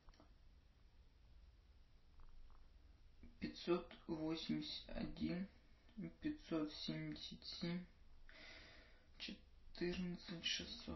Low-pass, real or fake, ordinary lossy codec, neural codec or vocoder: 7.2 kHz; real; MP3, 24 kbps; none